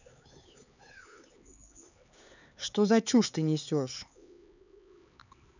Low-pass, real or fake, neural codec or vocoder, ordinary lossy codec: 7.2 kHz; fake; codec, 16 kHz, 4 kbps, X-Codec, HuBERT features, trained on LibriSpeech; none